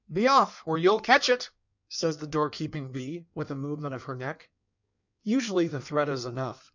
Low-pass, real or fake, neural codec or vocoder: 7.2 kHz; fake; codec, 16 kHz in and 24 kHz out, 1.1 kbps, FireRedTTS-2 codec